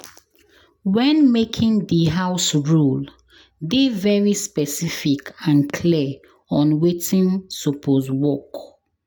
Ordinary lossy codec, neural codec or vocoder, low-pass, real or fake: none; none; none; real